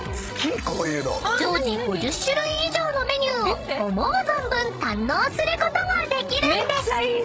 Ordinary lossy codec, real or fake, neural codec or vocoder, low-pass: none; fake; codec, 16 kHz, 16 kbps, FreqCodec, larger model; none